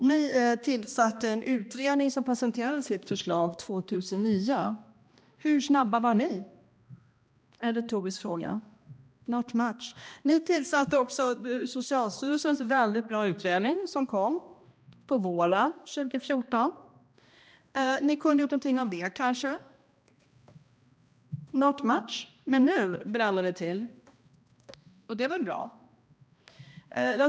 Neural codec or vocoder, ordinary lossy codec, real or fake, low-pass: codec, 16 kHz, 1 kbps, X-Codec, HuBERT features, trained on balanced general audio; none; fake; none